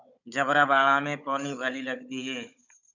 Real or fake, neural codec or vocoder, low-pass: fake; codec, 16 kHz, 16 kbps, FunCodec, trained on Chinese and English, 50 frames a second; 7.2 kHz